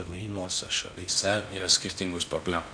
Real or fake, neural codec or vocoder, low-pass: fake; codec, 16 kHz in and 24 kHz out, 0.6 kbps, FocalCodec, streaming, 2048 codes; 9.9 kHz